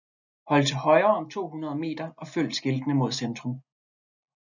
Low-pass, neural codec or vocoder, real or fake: 7.2 kHz; none; real